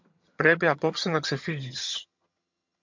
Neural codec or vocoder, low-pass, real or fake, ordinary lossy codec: vocoder, 22.05 kHz, 80 mel bands, HiFi-GAN; 7.2 kHz; fake; MP3, 64 kbps